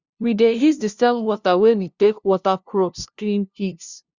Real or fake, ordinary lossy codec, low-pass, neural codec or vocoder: fake; Opus, 64 kbps; 7.2 kHz; codec, 16 kHz, 0.5 kbps, FunCodec, trained on LibriTTS, 25 frames a second